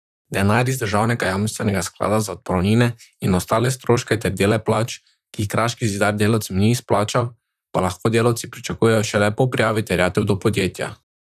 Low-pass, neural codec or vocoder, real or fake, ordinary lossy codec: 14.4 kHz; vocoder, 44.1 kHz, 128 mel bands, Pupu-Vocoder; fake; none